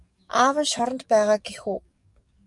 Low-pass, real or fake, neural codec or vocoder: 10.8 kHz; fake; codec, 44.1 kHz, 7.8 kbps, DAC